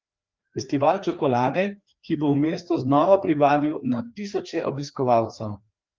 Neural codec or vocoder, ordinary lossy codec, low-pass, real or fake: codec, 16 kHz, 2 kbps, FreqCodec, larger model; Opus, 32 kbps; 7.2 kHz; fake